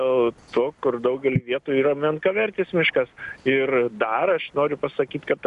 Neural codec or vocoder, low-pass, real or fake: none; 10.8 kHz; real